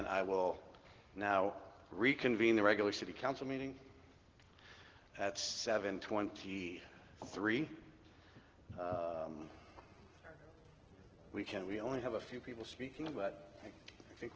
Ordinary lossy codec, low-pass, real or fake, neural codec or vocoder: Opus, 16 kbps; 7.2 kHz; real; none